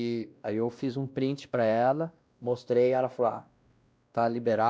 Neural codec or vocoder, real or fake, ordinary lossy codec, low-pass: codec, 16 kHz, 1 kbps, X-Codec, WavLM features, trained on Multilingual LibriSpeech; fake; none; none